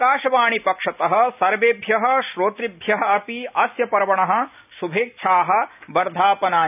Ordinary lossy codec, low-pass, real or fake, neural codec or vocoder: none; 3.6 kHz; real; none